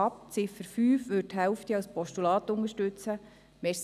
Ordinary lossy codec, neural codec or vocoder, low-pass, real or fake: none; none; 14.4 kHz; real